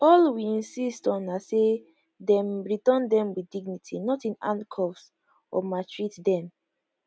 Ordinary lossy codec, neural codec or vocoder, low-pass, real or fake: none; none; none; real